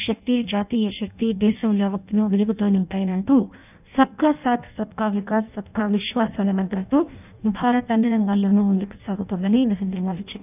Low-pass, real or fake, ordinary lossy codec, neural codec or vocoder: 3.6 kHz; fake; none; codec, 16 kHz in and 24 kHz out, 0.6 kbps, FireRedTTS-2 codec